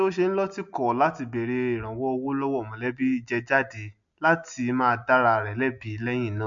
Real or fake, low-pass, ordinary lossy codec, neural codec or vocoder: real; 7.2 kHz; MP3, 64 kbps; none